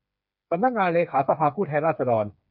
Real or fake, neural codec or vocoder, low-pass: fake; codec, 16 kHz, 4 kbps, FreqCodec, smaller model; 5.4 kHz